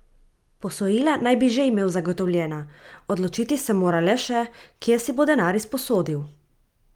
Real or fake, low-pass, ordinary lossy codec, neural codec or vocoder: real; 19.8 kHz; Opus, 32 kbps; none